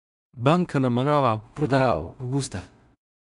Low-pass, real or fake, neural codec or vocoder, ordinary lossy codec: 10.8 kHz; fake; codec, 16 kHz in and 24 kHz out, 0.4 kbps, LongCat-Audio-Codec, two codebook decoder; none